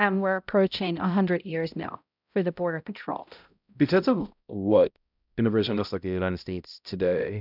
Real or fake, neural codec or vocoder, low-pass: fake; codec, 16 kHz, 0.5 kbps, X-Codec, HuBERT features, trained on balanced general audio; 5.4 kHz